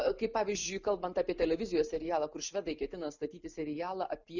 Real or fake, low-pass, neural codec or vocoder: real; 7.2 kHz; none